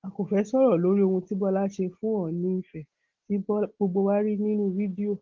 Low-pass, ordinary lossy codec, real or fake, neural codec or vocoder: 7.2 kHz; Opus, 16 kbps; real; none